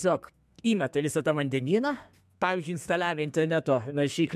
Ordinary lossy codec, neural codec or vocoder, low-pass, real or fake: MP3, 96 kbps; codec, 32 kHz, 1.9 kbps, SNAC; 14.4 kHz; fake